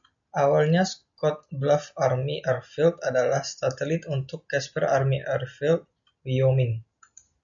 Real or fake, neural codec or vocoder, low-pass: real; none; 7.2 kHz